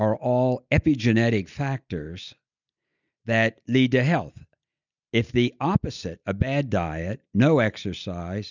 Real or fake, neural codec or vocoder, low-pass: real; none; 7.2 kHz